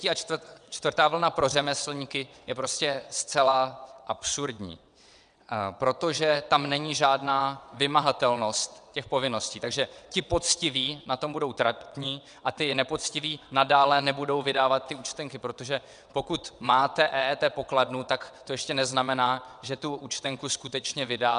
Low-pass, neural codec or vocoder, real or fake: 9.9 kHz; vocoder, 22.05 kHz, 80 mel bands, WaveNeXt; fake